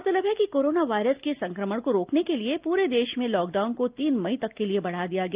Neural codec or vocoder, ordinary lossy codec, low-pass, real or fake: none; Opus, 24 kbps; 3.6 kHz; real